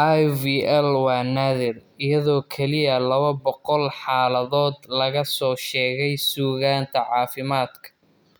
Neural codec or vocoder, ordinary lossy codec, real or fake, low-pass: none; none; real; none